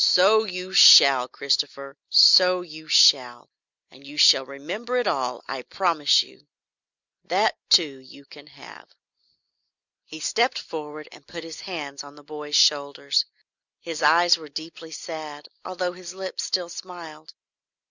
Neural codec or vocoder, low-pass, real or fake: none; 7.2 kHz; real